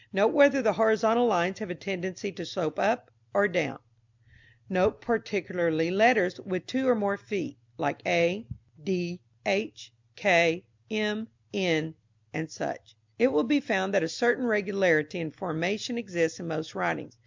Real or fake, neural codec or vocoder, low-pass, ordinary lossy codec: real; none; 7.2 kHz; MP3, 64 kbps